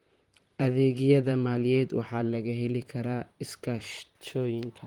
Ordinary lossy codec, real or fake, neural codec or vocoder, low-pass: Opus, 24 kbps; fake; vocoder, 44.1 kHz, 128 mel bands, Pupu-Vocoder; 19.8 kHz